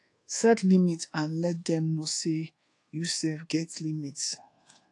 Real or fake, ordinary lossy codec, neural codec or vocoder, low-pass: fake; AAC, 48 kbps; codec, 24 kHz, 1.2 kbps, DualCodec; 10.8 kHz